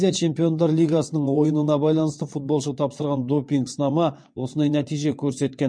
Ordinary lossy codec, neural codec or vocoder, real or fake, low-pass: MP3, 48 kbps; vocoder, 22.05 kHz, 80 mel bands, WaveNeXt; fake; 9.9 kHz